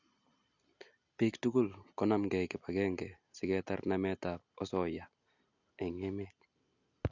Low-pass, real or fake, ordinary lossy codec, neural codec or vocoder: 7.2 kHz; real; none; none